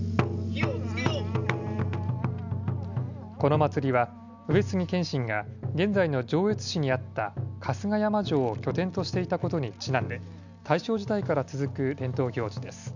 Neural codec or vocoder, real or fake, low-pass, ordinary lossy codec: none; real; 7.2 kHz; none